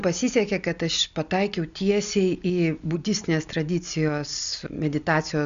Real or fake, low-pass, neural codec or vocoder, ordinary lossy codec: real; 7.2 kHz; none; Opus, 64 kbps